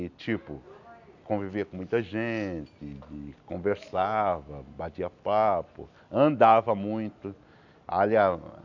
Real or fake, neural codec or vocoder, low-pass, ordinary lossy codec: real; none; 7.2 kHz; none